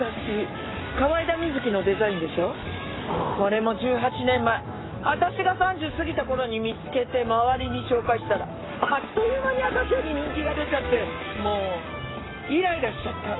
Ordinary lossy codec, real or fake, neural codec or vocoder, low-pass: AAC, 16 kbps; fake; codec, 44.1 kHz, 7.8 kbps, Pupu-Codec; 7.2 kHz